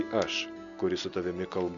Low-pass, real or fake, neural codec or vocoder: 7.2 kHz; real; none